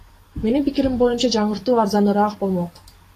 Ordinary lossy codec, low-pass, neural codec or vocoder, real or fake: AAC, 48 kbps; 14.4 kHz; codec, 44.1 kHz, 7.8 kbps, DAC; fake